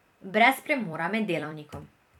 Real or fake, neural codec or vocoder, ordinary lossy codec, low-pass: fake; vocoder, 44.1 kHz, 128 mel bands every 256 samples, BigVGAN v2; none; 19.8 kHz